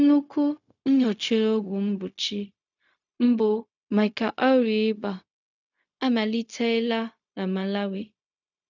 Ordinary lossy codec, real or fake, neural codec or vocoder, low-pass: none; fake; codec, 16 kHz, 0.4 kbps, LongCat-Audio-Codec; 7.2 kHz